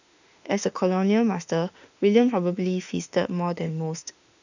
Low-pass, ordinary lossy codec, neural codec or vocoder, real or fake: 7.2 kHz; none; autoencoder, 48 kHz, 32 numbers a frame, DAC-VAE, trained on Japanese speech; fake